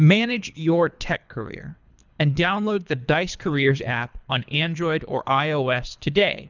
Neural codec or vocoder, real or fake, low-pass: codec, 24 kHz, 3 kbps, HILCodec; fake; 7.2 kHz